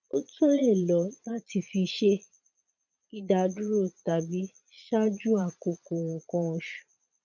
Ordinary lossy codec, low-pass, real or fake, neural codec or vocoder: none; 7.2 kHz; fake; vocoder, 22.05 kHz, 80 mel bands, Vocos